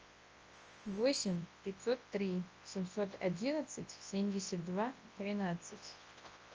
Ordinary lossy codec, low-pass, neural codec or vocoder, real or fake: Opus, 24 kbps; 7.2 kHz; codec, 24 kHz, 0.9 kbps, WavTokenizer, large speech release; fake